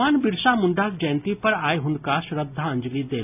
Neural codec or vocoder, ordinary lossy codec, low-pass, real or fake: none; none; 3.6 kHz; real